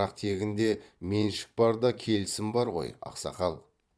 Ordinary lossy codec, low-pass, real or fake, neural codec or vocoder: none; none; fake; vocoder, 22.05 kHz, 80 mel bands, Vocos